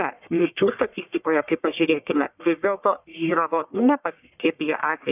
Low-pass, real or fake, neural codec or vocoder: 3.6 kHz; fake; codec, 44.1 kHz, 1.7 kbps, Pupu-Codec